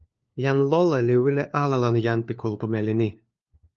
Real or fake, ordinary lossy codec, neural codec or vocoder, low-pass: fake; Opus, 24 kbps; codec, 16 kHz, 4 kbps, FunCodec, trained on LibriTTS, 50 frames a second; 7.2 kHz